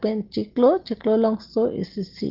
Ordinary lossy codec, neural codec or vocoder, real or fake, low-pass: Opus, 16 kbps; none; real; 5.4 kHz